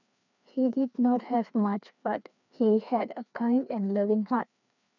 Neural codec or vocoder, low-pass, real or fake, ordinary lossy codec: codec, 16 kHz, 2 kbps, FreqCodec, larger model; 7.2 kHz; fake; none